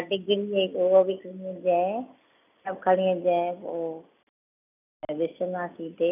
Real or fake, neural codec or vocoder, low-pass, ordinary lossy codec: real; none; 3.6 kHz; none